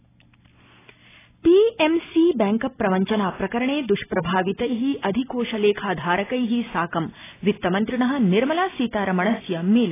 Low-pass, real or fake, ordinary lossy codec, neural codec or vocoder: 3.6 kHz; real; AAC, 16 kbps; none